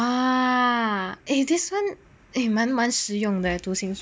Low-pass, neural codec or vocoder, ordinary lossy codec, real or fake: none; none; none; real